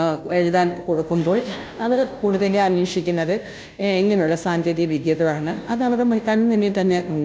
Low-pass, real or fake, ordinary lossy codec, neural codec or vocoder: none; fake; none; codec, 16 kHz, 0.5 kbps, FunCodec, trained on Chinese and English, 25 frames a second